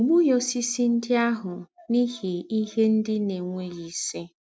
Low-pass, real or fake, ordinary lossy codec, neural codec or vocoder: none; real; none; none